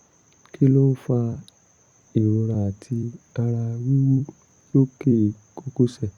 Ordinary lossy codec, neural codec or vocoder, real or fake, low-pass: Opus, 64 kbps; vocoder, 44.1 kHz, 128 mel bands every 256 samples, BigVGAN v2; fake; 19.8 kHz